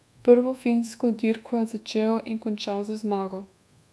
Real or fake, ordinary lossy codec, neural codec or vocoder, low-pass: fake; none; codec, 24 kHz, 1.2 kbps, DualCodec; none